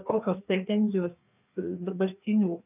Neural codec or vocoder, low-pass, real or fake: codec, 16 kHz, 2 kbps, FreqCodec, larger model; 3.6 kHz; fake